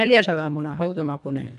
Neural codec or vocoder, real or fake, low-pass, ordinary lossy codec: codec, 24 kHz, 1.5 kbps, HILCodec; fake; 10.8 kHz; none